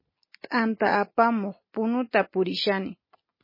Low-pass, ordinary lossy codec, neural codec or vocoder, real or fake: 5.4 kHz; MP3, 24 kbps; none; real